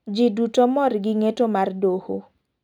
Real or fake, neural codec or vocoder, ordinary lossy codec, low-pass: real; none; none; 19.8 kHz